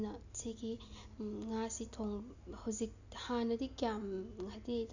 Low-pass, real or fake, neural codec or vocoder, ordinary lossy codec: 7.2 kHz; real; none; none